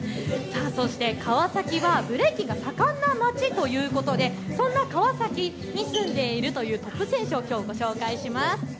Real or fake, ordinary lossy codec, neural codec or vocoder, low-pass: real; none; none; none